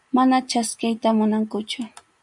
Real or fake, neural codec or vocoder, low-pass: real; none; 10.8 kHz